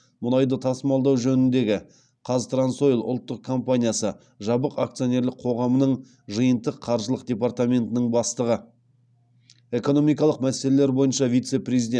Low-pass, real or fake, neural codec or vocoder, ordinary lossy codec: 9.9 kHz; real; none; none